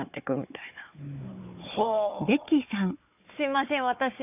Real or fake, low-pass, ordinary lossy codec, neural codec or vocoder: fake; 3.6 kHz; none; codec, 16 kHz, 4 kbps, FunCodec, trained on Chinese and English, 50 frames a second